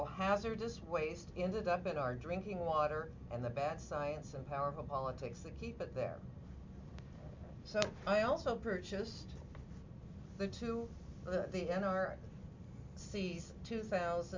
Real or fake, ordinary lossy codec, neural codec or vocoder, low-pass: real; MP3, 64 kbps; none; 7.2 kHz